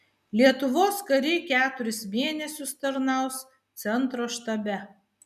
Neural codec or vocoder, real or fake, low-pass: none; real; 14.4 kHz